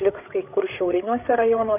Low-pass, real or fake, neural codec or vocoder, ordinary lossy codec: 3.6 kHz; fake; codec, 16 kHz, 8 kbps, FreqCodec, larger model; AAC, 32 kbps